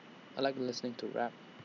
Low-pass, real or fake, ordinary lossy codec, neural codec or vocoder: 7.2 kHz; real; none; none